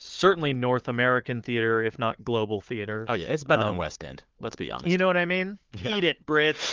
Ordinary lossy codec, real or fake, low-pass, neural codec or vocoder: Opus, 24 kbps; fake; 7.2 kHz; codec, 16 kHz, 4 kbps, FunCodec, trained on Chinese and English, 50 frames a second